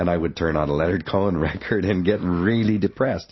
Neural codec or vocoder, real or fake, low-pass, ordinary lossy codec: none; real; 7.2 kHz; MP3, 24 kbps